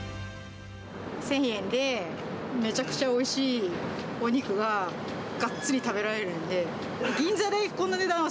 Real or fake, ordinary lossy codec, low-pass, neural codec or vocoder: real; none; none; none